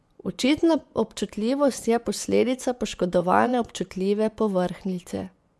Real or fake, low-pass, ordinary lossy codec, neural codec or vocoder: fake; none; none; vocoder, 24 kHz, 100 mel bands, Vocos